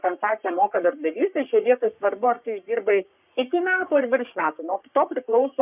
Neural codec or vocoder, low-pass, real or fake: codec, 44.1 kHz, 3.4 kbps, Pupu-Codec; 3.6 kHz; fake